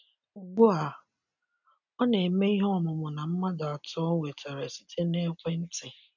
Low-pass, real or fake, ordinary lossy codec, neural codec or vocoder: 7.2 kHz; real; none; none